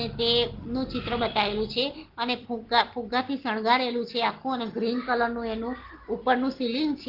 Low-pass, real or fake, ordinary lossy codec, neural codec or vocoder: 5.4 kHz; real; Opus, 16 kbps; none